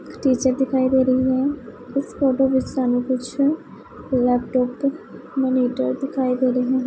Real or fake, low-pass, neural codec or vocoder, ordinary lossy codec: real; none; none; none